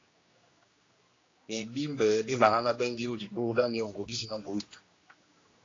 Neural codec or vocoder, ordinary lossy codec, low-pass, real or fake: codec, 16 kHz, 1 kbps, X-Codec, HuBERT features, trained on general audio; AAC, 32 kbps; 7.2 kHz; fake